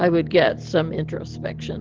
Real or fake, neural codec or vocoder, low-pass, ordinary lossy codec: real; none; 7.2 kHz; Opus, 16 kbps